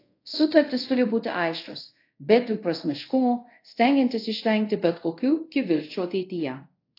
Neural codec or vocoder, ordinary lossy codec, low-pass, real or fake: codec, 24 kHz, 0.5 kbps, DualCodec; AAC, 32 kbps; 5.4 kHz; fake